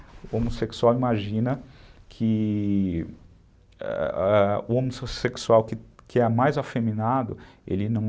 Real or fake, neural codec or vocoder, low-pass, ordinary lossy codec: real; none; none; none